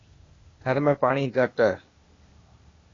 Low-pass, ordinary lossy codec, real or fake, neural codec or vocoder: 7.2 kHz; AAC, 32 kbps; fake; codec, 16 kHz, 0.8 kbps, ZipCodec